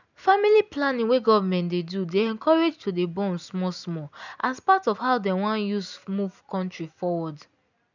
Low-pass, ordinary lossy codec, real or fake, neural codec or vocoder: 7.2 kHz; none; real; none